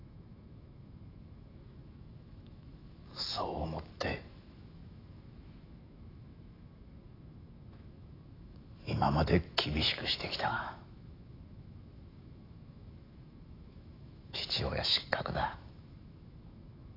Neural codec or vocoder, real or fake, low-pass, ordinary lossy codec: autoencoder, 48 kHz, 128 numbers a frame, DAC-VAE, trained on Japanese speech; fake; 5.4 kHz; AAC, 24 kbps